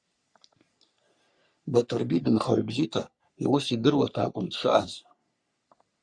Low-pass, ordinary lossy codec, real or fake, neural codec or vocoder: 9.9 kHz; Opus, 64 kbps; fake; codec, 44.1 kHz, 3.4 kbps, Pupu-Codec